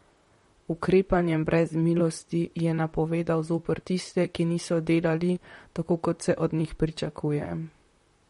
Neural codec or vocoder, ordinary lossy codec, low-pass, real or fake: vocoder, 44.1 kHz, 128 mel bands, Pupu-Vocoder; MP3, 48 kbps; 19.8 kHz; fake